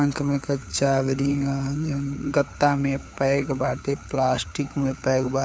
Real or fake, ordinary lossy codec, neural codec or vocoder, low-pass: fake; none; codec, 16 kHz, 4 kbps, FunCodec, trained on LibriTTS, 50 frames a second; none